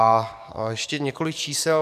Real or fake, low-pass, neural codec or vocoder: fake; 14.4 kHz; codec, 44.1 kHz, 7.8 kbps, DAC